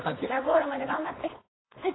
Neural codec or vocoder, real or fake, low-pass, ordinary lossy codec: codec, 16 kHz, 4.8 kbps, FACodec; fake; 7.2 kHz; AAC, 16 kbps